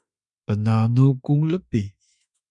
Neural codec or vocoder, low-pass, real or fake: autoencoder, 48 kHz, 32 numbers a frame, DAC-VAE, trained on Japanese speech; 10.8 kHz; fake